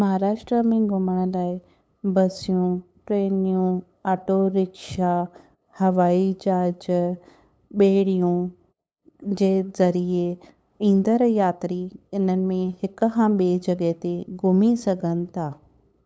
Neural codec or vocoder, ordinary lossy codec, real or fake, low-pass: codec, 16 kHz, 8 kbps, FunCodec, trained on LibriTTS, 25 frames a second; none; fake; none